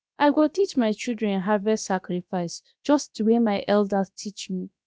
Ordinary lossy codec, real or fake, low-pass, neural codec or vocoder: none; fake; none; codec, 16 kHz, about 1 kbps, DyCAST, with the encoder's durations